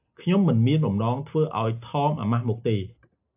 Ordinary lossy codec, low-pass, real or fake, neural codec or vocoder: AAC, 32 kbps; 3.6 kHz; real; none